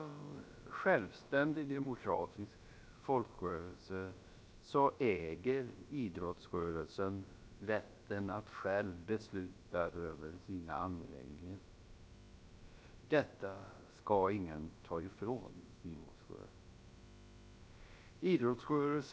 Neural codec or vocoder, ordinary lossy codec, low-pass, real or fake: codec, 16 kHz, about 1 kbps, DyCAST, with the encoder's durations; none; none; fake